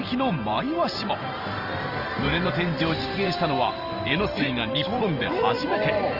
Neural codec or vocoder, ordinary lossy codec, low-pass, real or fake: vocoder, 44.1 kHz, 128 mel bands every 512 samples, BigVGAN v2; Opus, 24 kbps; 5.4 kHz; fake